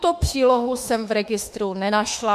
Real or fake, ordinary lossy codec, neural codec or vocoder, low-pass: fake; MP3, 64 kbps; autoencoder, 48 kHz, 32 numbers a frame, DAC-VAE, trained on Japanese speech; 14.4 kHz